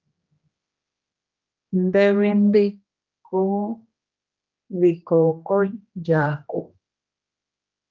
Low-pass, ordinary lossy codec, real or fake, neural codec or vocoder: 7.2 kHz; Opus, 32 kbps; fake; codec, 16 kHz, 1 kbps, X-Codec, HuBERT features, trained on general audio